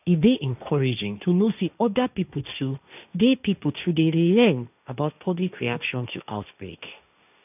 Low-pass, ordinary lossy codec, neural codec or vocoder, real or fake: 3.6 kHz; none; codec, 16 kHz, 1.1 kbps, Voila-Tokenizer; fake